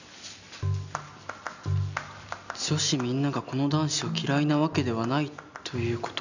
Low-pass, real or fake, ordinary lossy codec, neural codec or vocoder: 7.2 kHz; real; none; none